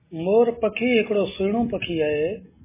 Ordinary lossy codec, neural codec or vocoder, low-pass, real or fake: MP3, 16 kbps; none; 3.6 kHz; real